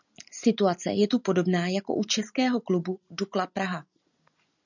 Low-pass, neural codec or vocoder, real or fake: 7.2 kHz; none; real